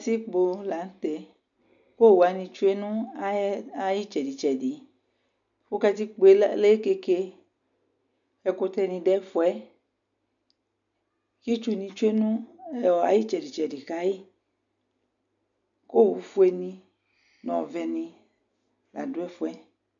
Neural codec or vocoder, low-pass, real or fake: none; 7.2 kHz; real